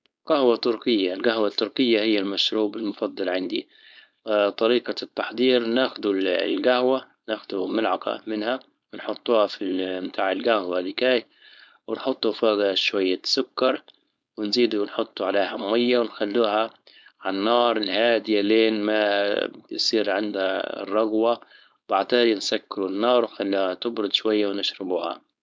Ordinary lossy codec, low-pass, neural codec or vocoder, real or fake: none; none; codec, 16 kHz, 4.8 kbps, FACodec; fake